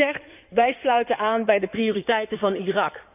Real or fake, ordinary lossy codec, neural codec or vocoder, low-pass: fake; none; codec, 16 kHz in and 24 kHz out, 2.2 kbps, FireRedTTS-2 codec; 3.6 kHz